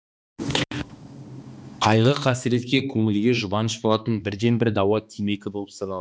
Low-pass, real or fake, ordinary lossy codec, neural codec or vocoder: none; fake; none; codec, 16 kHz, 2 kbps, X-Codec, HuBERT features, trained on balanced general audio